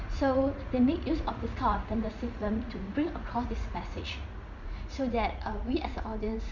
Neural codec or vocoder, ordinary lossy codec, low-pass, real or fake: vocoder, 44.1 kHz, 80 mel bands, Vocos; none; 7.2 kHz; fake